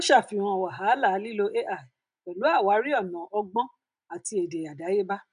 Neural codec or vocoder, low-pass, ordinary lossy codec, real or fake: none; 9.9 kHz; none; real